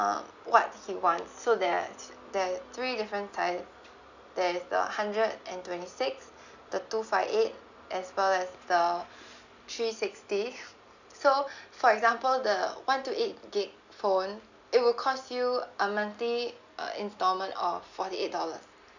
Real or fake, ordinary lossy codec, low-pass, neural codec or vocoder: real; none; 7.2 kHz; none